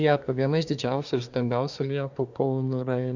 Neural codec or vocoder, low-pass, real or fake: codec, 24 kHz, 1 kbps, SNAC; 7.2 kHz; fake